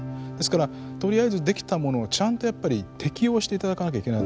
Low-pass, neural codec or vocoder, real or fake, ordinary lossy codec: none; none; real; none